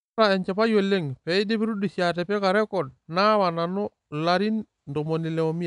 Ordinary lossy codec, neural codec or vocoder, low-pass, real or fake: none; none; 10.8 kHz; real